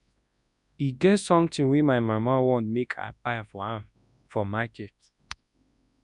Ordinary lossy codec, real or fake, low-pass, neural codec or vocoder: none; fake; 10.8 kHz; codec, 24 kHz, 0.9 kbps, WavTokenizer, large speech release